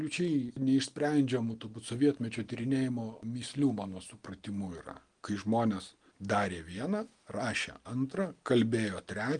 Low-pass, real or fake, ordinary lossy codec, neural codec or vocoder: 9.9 kHz; real; Opus, 24 kbps; none